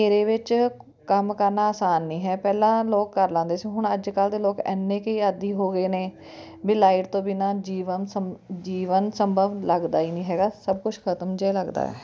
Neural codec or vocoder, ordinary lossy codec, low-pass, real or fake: none; none; none; real